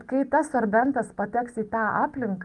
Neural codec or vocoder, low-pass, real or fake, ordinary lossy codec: none; 10.8 kHz; real; Opus, 32 kbps